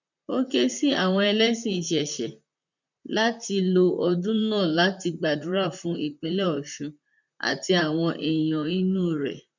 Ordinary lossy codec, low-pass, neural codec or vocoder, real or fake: none; 7.2 kHz; vocoder, 44.1 kHz, 128 mel bands, Pupu-Vocoder; fake